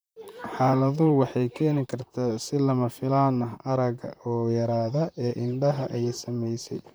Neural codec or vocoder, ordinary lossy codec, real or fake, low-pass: vocoder, 44.1 kHz, 128 mel bands, Pupu-Vocoder; none; fake; none